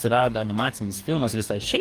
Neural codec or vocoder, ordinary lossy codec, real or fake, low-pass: codec, 44.1 kHz, 2.6 kbps, DAC; Opus, 32 kbps; fake; 14.4 kHz